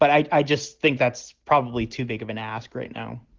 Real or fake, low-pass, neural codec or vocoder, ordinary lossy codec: real; 7.2 kHz; none; Opus, 16 kbps